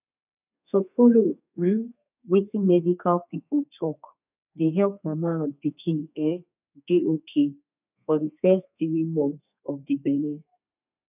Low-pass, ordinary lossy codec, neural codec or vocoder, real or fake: 3.6 kHz; none; codec, 32 kHz, 1.9 kbps, SNAC; fake